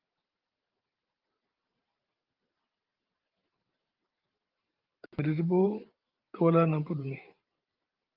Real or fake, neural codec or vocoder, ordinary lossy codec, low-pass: fake; vocoder, 44.1 kHz, 128 mel bands every 512 samples, BigVGAN v2; Opus, 24 kbps; 5.4 kHz